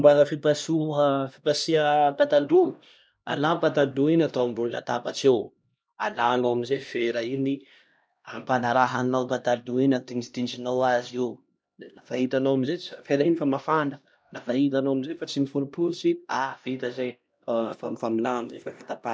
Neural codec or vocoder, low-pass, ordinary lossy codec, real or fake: codec, 16 kHz, 1 kbps, X-Codec, HuBERT features, trained on LibriSpeech; none; none; fake